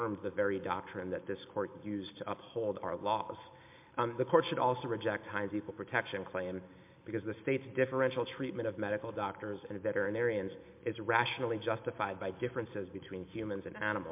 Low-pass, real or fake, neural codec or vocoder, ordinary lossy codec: 3.6 kHz; real; none; MP3, 32 kbps